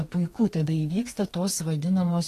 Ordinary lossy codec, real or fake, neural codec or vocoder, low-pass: AAC, 48 kbps; fake; codec, 32 kHz, 1.9 kbps, SNAC; 14.4 kHz